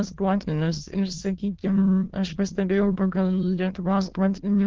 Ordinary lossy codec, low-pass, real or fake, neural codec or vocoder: Opus, 16 kbps; 7.2 kHz; fake; autoencoder, 22.05 kHz, a latent of 192 numbers a frame, VITS, trained on many speakers